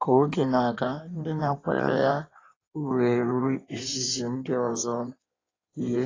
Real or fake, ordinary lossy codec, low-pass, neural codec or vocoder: fake; AAC, 32 kbps; 7.2 kHz; codec, 16 kHz in and 24 kHz out, 1.1 kbps, FireRedTTS-2 codec